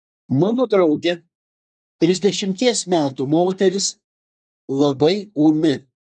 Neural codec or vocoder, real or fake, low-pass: codec, 24 kHz, 1 kbps, SNAC; fake; 10.8 kHz